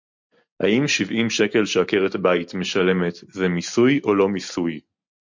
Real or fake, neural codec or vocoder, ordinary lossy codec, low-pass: real; none; MP3, 48 kbps; 7.2 kHz